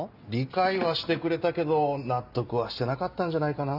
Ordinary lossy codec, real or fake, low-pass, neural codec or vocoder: none; real; 5.4 kHz; none